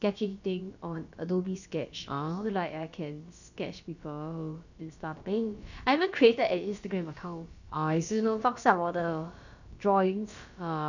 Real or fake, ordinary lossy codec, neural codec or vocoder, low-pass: fake; none; codec, 16 kHz, about 1 kbps, DyCAST, with the encoder's durations; 7.2 kHz